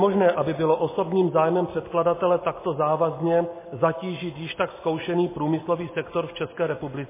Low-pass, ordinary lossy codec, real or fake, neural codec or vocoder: 3.6 kHz; MP3, 16 kbps; real; none